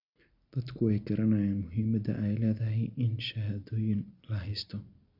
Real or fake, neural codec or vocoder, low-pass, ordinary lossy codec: real; none; 5.4 kHz; Opus, 64 kbps